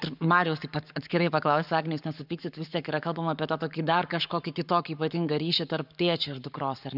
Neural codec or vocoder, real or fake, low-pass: codec, 44.1 kHz, 7.8 kbps, Pupu-Codec; fake; 5.4 kHz